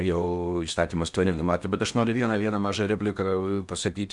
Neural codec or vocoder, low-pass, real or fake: codec, 16 kHz in and 24 kHz out, 0.8 kbps, FocalCodec, streaming, 65536 codes; 10.8 kHz; fake